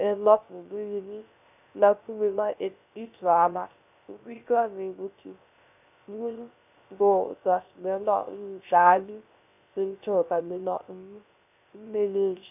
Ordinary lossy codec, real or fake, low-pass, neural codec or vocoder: none; fake; 3.6 kHz; codec, 16 kHz, 0.3 kbps, FocalCodec